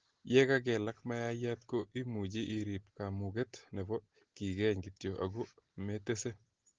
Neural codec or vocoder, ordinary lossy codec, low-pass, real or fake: none; Opus, 16 kbps; 7.2 kHz; real